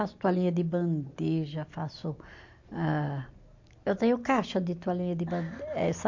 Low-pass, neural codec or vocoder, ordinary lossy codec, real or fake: 7.2 kHz; none; MP3, 48 kbps; real